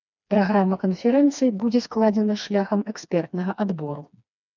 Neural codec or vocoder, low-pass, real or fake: codec, 16 kHz, 2 kbps, FreqCodec, smaller model; 7.2 kHz; fake